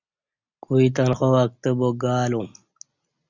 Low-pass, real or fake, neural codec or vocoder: 7.2 kHz; real; none